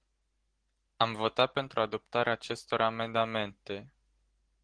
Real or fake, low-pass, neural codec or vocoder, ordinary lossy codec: real; 9.9 kHz; none; Opus, 16 kbps